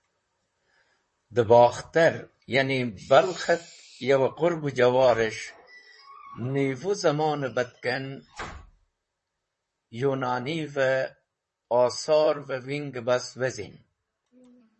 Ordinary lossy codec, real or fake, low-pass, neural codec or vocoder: MP3, 32 kbps; fake; 10.8 kHz; vocoder, 44.1 kHz, 128 mel bands, Pupu-Vocoder